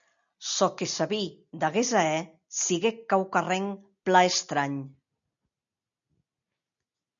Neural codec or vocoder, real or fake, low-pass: none; real; 7.2 kHz